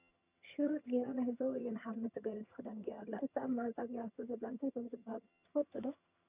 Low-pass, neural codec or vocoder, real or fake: 3.6 kHz; vocoder, 22.05 kHz, 80 mel bands, HiFi-GAN; fake